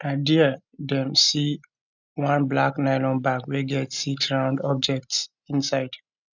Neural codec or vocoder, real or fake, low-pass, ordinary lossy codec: none; real; 7.2 kHz; none